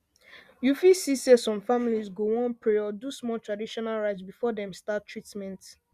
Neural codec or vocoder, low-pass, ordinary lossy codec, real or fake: none; 14.4 kHz; Opus, 64 kbps; real